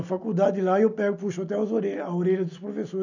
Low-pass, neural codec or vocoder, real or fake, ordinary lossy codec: 7.2 kHz; none; real; none